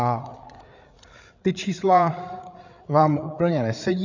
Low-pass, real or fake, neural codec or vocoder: 7.2 kHz; fake; codec, 16 kHz, 8 kbps, FreqCodec, larger model